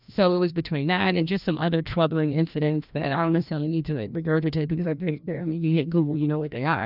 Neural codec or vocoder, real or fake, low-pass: codec, 16 kHz, 1 kbps, FreqCodec, larger model; fake; 5.4 kHz